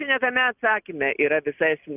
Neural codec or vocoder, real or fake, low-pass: none; real; 3.6 kHz